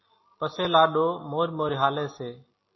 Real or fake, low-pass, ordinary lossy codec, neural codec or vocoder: real; 7.2 kHz; MP3, 24 kbps; none